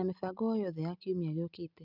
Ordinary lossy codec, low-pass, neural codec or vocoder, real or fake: none; 5.4 kHz; none; real